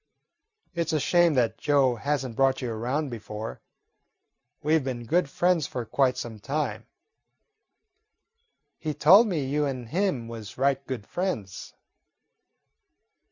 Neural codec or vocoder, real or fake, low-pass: none; real; 7.2 kHz